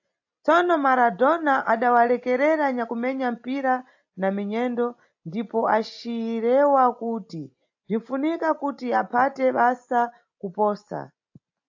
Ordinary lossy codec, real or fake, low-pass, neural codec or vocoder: AAC, 48 kbps; real; 7.2 kHz; none